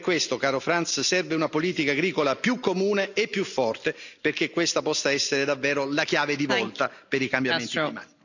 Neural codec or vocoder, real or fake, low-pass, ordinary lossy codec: none; real; 7.2 kHz; none